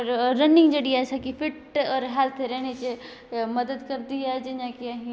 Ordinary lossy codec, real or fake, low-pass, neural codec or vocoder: none; real; none; none